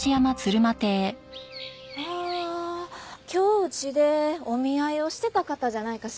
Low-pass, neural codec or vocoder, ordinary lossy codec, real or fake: none; none; none; real